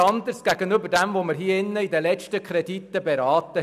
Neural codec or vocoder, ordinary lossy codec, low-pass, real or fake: none; none; 14.4 kHz; real